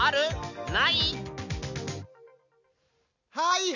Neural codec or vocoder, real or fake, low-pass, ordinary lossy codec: none; real; 7.2 kHz; none